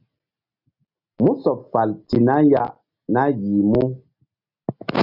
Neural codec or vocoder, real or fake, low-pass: none; real; 5.4 kHz